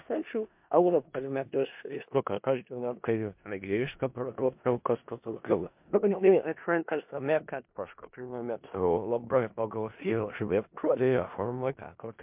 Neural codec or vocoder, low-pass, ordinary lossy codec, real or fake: codec, 16 kHz in and 24 kHz out, 0.4 kbps, LongCat-Audio-Codec, four codebook decoder; 3.6 kHz; MP3, 32 kbps; fake